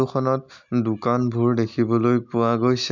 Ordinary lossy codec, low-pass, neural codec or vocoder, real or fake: MP3, 64 kbps; 7.2 kHz; none; real